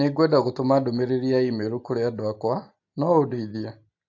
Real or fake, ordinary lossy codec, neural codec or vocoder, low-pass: real; AAC, 48 kbps; none; 7.2 kHz